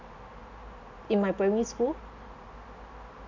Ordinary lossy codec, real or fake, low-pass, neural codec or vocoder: none; real; 7.2 kHz; none